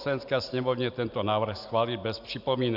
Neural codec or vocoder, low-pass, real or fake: none; 5.4 kHz; real